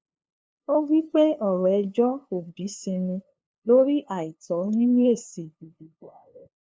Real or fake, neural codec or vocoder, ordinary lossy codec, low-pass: fake; codec, 16 kHz, 2 kbps, FunCodec, trained on LibriTTS, 25 frames a second; none; none